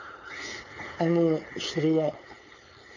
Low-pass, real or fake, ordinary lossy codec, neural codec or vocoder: 7.2 kHz; fake; none; codec, 16 kHz, 4.8 kbps, FACodec